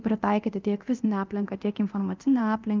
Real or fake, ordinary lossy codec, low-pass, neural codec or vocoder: fake; Opus, 32 kbps; 7.2 kHz; codec, 24 kHz, 0.9 kbps, DualCodec